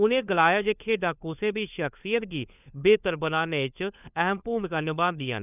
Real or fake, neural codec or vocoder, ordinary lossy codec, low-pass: fake; codec, 16 kHz, 4 kbps, FunCodec, trained on LibriTTS, 50 frames a second; none; 3.6 kHz